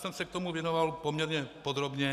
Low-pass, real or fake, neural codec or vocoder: 14.4 kHz; real; none